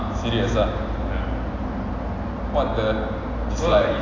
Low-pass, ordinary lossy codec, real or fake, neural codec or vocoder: 7.2 kHz; AAC, 32 kbps; real; none